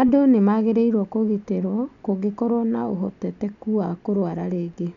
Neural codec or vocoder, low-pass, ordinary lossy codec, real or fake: none; 7.2 kHz; none; real